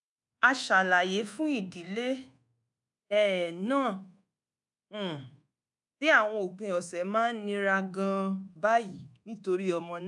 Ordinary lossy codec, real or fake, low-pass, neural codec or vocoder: none; fake; 10.8 kHz; codec, 24 kHz, 1.2 kbps, DualCodec